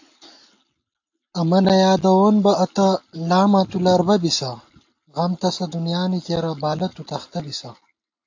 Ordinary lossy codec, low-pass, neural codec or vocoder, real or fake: AAC, 48 kbps; 7.2 kHz; none; real